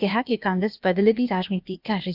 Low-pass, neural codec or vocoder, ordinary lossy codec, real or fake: 5.4 kHz; codec, 16 kHz, 0.8 kbps, ZipCodec; none; fake